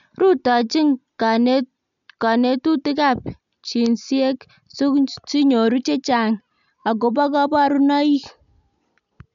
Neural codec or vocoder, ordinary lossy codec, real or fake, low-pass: none; none; real; 7.2 kHz